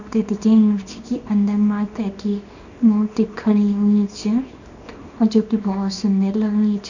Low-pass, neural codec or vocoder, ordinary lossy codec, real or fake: 7.2 kHz; codec, 24 kHz, 0.9 kbps, WavTokenizer, small release; none; fake